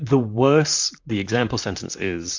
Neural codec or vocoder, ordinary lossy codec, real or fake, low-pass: vocoder, 44.1 kHz, 128 mel bands, Pupu-Vocoder; MP3, 64 kbps; fake; 7.2 kHz